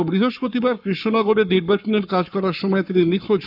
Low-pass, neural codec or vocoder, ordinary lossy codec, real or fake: 5.4 kHz; codec, 44.1 kHz, 7.8 kbps, Pupu-Codec; none; fake